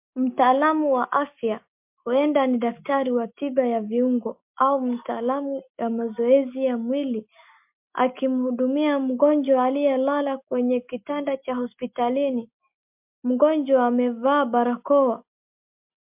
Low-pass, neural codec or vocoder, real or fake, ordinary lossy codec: 3.6 kHz; none; real; MP3, 32 kbps